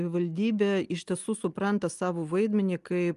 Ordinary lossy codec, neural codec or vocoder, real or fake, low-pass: Opus, 32 kbps; none; real; 10.8 kHz